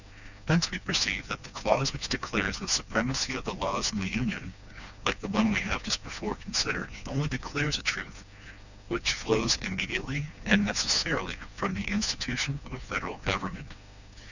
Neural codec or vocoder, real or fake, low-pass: codec, 16 kHz, 2 kbps, FreqCodec, smaller model; fake; 7.2 kHz